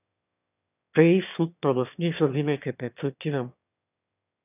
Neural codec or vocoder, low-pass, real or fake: autoencoder, 22.05 kHz, a latent of 192 numbers a frame, VITS, trained on one speaker; 3.6 kHz; fake